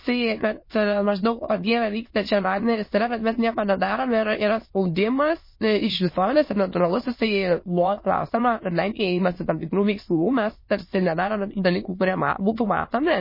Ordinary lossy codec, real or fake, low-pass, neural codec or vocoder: MP3, 24 kbps; fake; 5.4 kHz; autoencoder, 22.05 kHz, a latent of 192 numbers a frame, VITS, trained on many speakers